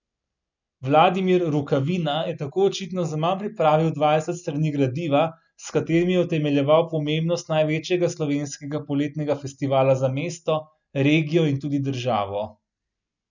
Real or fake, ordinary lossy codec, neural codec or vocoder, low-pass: real; none; none; 7.2 kHz